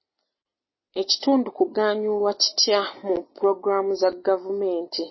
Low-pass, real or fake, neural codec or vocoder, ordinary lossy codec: 7.2 kHz; real; none; MP3, 24 kbps